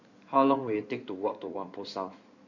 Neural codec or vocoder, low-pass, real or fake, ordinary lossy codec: codec, 16 kHz in and 24 kHz out, 2.2 kbps, FireRedTTS-2 codec; 7.2 kHz; fake; none